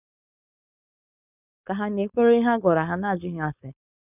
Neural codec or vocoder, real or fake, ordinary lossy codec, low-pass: codec, 16 kHz, 4.8 kbps, FACodec; fake; none; 3.6 kHz